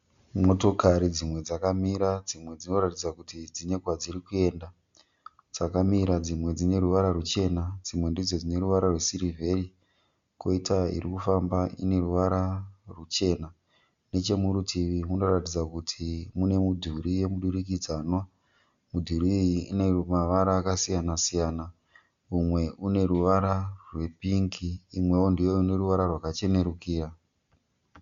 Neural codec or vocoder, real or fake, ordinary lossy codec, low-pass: none; real; Opus, 64 kbps; 7.2 kHz